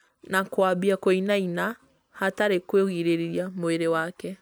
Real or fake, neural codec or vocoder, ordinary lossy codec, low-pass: real; none; none; none